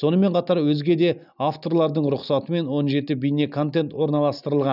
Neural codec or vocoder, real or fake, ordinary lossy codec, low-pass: none; real; none; 5.4 kHz